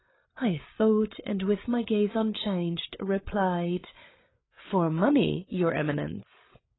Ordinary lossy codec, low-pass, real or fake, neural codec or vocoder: AAC, 16 kbps; 7.2 kHz; fake; codec, 16 kHz, 8 kbps, FreqCodec, larger model